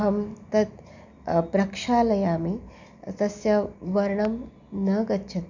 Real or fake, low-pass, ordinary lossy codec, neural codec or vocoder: real; 7.2 kHz; none; none